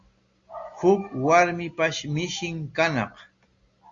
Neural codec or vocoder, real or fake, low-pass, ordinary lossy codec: none; real; 7.2 kHz; Opus, 64 kbps